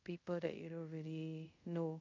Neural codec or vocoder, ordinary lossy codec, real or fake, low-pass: codec, 24 kHz, 0.5 kbps, DualCodec; MP3, 64 kbps; fake; 7.2 kHz